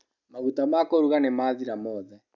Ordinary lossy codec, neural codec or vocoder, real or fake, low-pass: none; none; real; 7.2 kHz